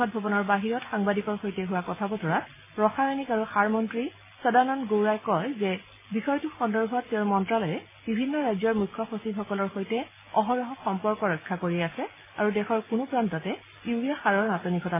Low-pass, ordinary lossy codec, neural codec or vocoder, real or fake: 3.6 kHz; MP3, 16 kbps; none; real